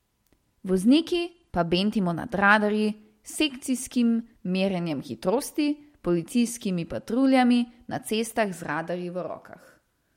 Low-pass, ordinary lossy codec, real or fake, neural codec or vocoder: 19.8 kHz; MP3, 64 kbps; real; none